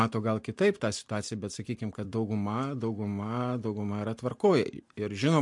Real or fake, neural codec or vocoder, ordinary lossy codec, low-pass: fake; vocoder, 44.1 kHz, 128 mel bands every 256 samples, BigVGAN v2; MP3, 64 kbps; 10.8 kHz